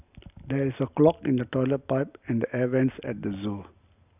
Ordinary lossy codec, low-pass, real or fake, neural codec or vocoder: none; 3.6 kHz; real; none